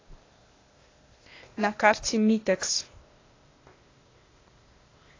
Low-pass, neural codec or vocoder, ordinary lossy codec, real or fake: 7.2 kHz; codec, 16 kHz, 0.8 kbps, ZipCodec; AAC, 32 kbps; fake